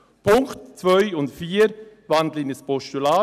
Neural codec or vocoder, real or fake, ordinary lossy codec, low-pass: none; real; none; 14.4 kHz